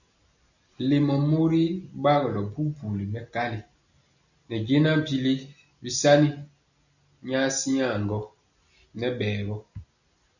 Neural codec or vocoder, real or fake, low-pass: none; real; 7.2 kHz